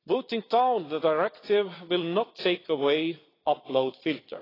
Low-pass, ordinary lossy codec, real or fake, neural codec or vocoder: 5.4 kHz; AAC, 24 kbps; fake; vocoder, 22.05 kHz, 80 mel bands, Vocos